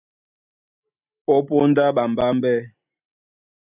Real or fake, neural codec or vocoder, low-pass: real; none; 3.6 kHz